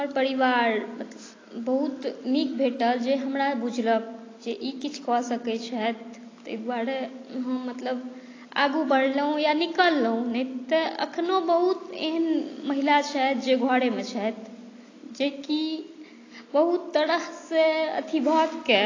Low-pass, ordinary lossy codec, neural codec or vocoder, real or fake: 7.2 kHz; AAC, 32 kbps; none; real